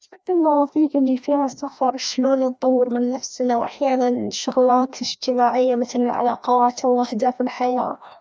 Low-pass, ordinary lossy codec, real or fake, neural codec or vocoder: none; none; fake; codec, 16 kHz, 1 kbps, FreqCodec, larger model